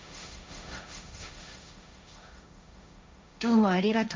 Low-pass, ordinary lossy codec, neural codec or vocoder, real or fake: none; none; codec, 16 kHz, 1.1 kbps, Voila-Tokenizer; fake